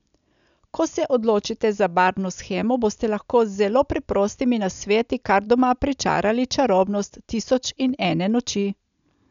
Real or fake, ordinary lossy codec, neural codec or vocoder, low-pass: real; none; none; 7.2 kHz